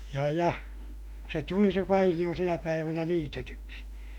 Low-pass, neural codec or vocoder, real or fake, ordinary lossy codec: 19.8 kHz; autoencoder, 48 kHz, 32 numbers a frame, DAC-VAE, trained on Japanese speech; fake; none